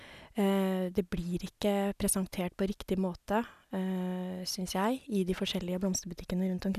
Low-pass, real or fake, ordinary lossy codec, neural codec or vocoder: 14.4 kHz; real; none; none